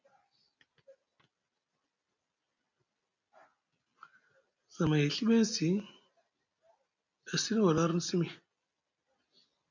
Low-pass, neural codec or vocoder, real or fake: 7.2 kHz; none; real